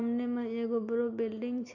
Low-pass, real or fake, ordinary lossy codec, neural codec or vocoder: 7.2 kHz; real; none; none